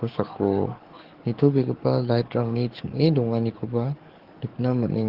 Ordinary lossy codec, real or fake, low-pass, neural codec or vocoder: Opus, 16 kbps; fake; 5.4 kHz; codec, 44.1 kHz, 7.8 kbps, DAC